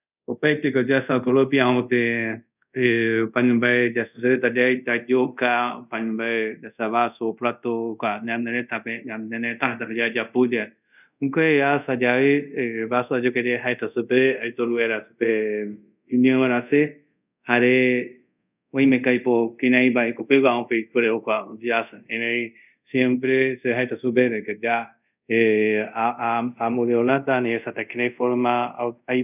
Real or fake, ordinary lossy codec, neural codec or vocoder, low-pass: fake; none; codec, 24 kHz, 0.5 kbps, DualCodec; 3.6 kHz